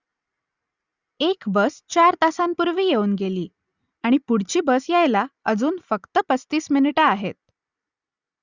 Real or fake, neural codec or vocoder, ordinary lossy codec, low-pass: real; none; Opus, 64 kbps; 7.2 kHz